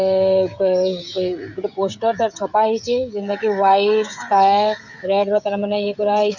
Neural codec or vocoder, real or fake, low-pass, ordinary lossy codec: codec, 16 kHz, 16 kbps, FreqCodec, smaller model; fake; 7.2 kHz; none